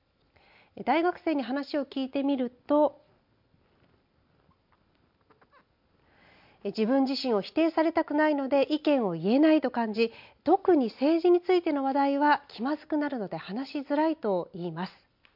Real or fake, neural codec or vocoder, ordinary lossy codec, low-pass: real; none; MP3, 48 kbps; 5.4 kHz